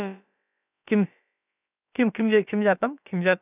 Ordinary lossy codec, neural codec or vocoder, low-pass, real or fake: none; codec, 16 kHz, about 1 kbps, DyCAST, with the encoder's durations; 3.6 kHz; fake